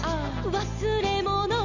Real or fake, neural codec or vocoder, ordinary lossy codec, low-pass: real; none; none; 7.2 kHz